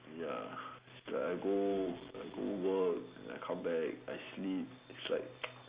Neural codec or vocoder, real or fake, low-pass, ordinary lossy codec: none; real; 3.6 kHz; Opus, 64 kbps